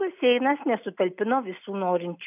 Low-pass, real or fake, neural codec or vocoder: 3.6 kHz; real; none